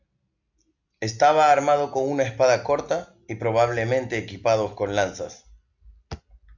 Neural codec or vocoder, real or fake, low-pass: none; real; 7.2 kHz